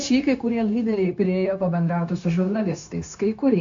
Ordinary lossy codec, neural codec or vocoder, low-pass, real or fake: AAC, 64 kbps; codec, 16 kHz, 0.9 kbps, LongCat-Audio-Codec; 7.2 kHz; fake